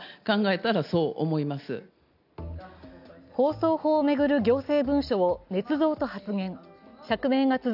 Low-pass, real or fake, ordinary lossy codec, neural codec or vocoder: 5.4 kHz; real; none; none